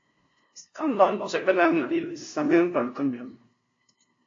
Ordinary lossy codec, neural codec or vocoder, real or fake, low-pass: AAC, 48 kbps; codec, 16 kHz, 0.5 kbps, FunCodec, trained on LibriTTS, 25 frames a second; fake; 7.2 kHz